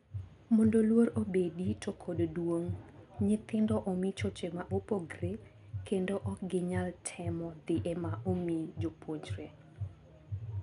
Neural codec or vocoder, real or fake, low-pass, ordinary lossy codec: none; real; 10.8 kHz; none